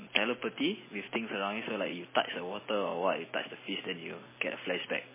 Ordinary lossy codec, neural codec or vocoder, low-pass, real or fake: MP3, 16 kbps; none; 3.6 kHz; real